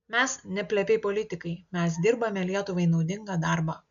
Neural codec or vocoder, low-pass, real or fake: none; 7.2 kHz; real